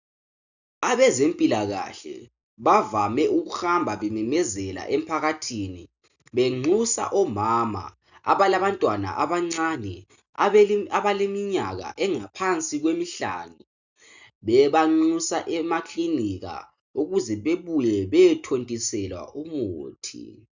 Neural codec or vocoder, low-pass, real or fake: none; 7.2 kHz; real